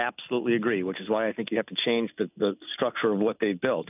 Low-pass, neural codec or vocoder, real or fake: 3.6 kHz; none; real